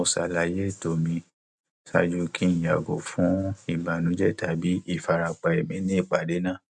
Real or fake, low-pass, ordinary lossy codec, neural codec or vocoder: real; 10.8 kHz; none; none